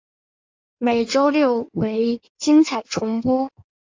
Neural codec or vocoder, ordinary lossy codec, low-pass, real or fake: codec, 16 kHz in and 24 kHz out, 1.1 kbps, FireRedTTS-2 codec; AAC, 48 kbps; 7.2 kHz; fake